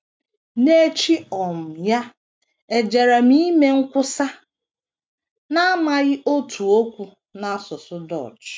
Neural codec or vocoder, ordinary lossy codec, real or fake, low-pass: none; none; real; none